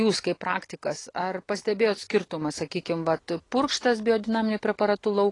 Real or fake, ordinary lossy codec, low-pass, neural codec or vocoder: real; AAC, 32 kbps; 10.8 kHz; none